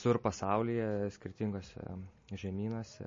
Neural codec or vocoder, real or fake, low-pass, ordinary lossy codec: none; real; 7.2 kHz; MP3, 32 kbps